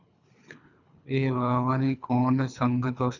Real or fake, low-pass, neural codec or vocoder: fake; 7.2 kHz; codec, 24 kHz, 3 kbps, HILCodec